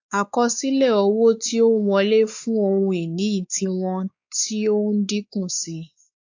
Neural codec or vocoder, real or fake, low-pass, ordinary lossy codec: codec, 16 kHz, 4 kbps, X-Codec, WavLM features, trained on Multilingual LibriSpeech; fake; 7.2 kHz; AAC, 48 kbps